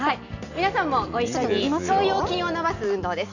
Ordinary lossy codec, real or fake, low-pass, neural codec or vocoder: none; real; 7.2 kHz; none